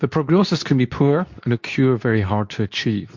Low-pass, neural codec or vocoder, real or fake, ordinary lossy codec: 7.2 kHz; codec, 24 kHz, 0.9 kbps, WavTokenizer, medium speech release version 2; fake; MP3, 48 kbps